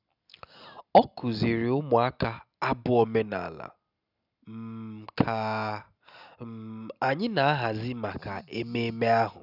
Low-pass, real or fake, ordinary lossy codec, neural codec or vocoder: 5.4 kHz; real; none; none